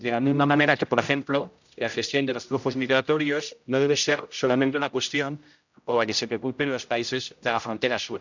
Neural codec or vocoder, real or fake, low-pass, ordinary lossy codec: codec, 16 kHz, 0.5 kbps, X-Codec, HuBERT features, trained on general audio; fake; 7.2 kHz; none